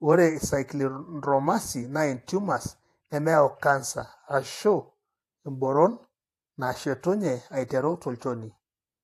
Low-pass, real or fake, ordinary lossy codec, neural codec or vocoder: 14.4 kHz; fake; AAC, 64 kbps; vocoder, 48 kHz, 128 mel bands, Vocos